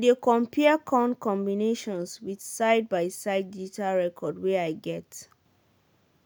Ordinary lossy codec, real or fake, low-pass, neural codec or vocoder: none; real; none; none